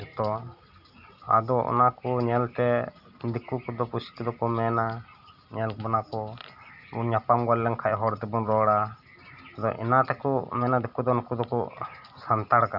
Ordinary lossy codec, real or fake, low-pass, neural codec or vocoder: none; real; 5.4 kHz; none